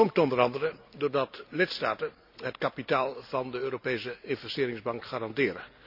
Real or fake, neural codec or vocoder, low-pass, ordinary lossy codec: real; none; 5.4 kHz; none